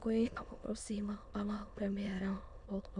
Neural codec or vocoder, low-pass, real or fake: autoencoder, 22.05 kHz, a latent of 192 numbers a frame, VITS, trained on many speakers; 9.9 kHz; fake